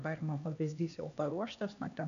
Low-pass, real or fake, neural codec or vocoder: 7.2 kHz; fake; codec, 16 kHz, 2 kbps, X-Codec, HuBERT features, trained on LibriSpeech